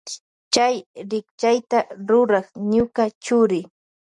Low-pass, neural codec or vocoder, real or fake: 10.8 kHz; none; real